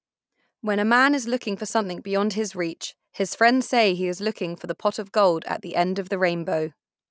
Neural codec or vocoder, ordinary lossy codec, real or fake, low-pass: none; none; real; none